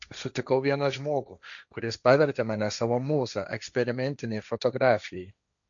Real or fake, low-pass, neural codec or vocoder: fake; 7.2 kHz; codec, 16 kHz, 1.1 kbps, Voila-Tokenizer